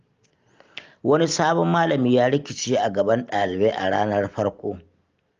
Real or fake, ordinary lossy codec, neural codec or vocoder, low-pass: real; Opus, 32 kbps; none; 7.2 kHz